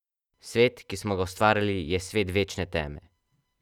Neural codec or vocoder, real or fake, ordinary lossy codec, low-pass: none; real; none; 19.8 kHz